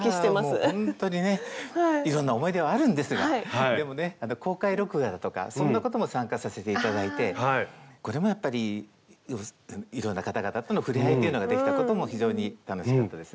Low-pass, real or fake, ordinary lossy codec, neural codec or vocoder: none; real; none; none